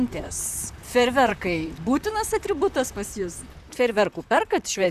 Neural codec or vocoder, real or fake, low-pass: vocoder, 44.1 kHz, 128 mel bands, Pupu-Vocoder; fake; 14.4 kHz